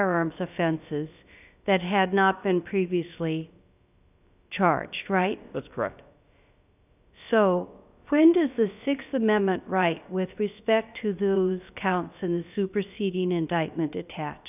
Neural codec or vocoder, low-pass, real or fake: codec, 16 kHz, about 1 kbps, DyCAST, with the encoder's durations; 3.6 kHz; fake